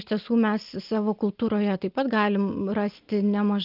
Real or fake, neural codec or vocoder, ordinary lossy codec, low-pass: real; none; Opus, 24 kbps; 5.4 kHz